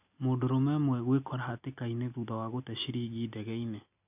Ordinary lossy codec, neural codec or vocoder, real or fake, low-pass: none; none; real; 3.6 kHz